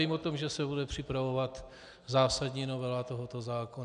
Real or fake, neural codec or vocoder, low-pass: real; none; 9.9 kHz